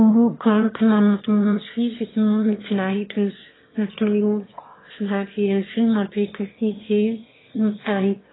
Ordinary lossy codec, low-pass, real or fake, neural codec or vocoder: AAC, 16 kbps; 7.2 kHz; fake; autoencoder, 22.05 kHz, a latent of 192 numbers a frame, VITS, trained on one speaker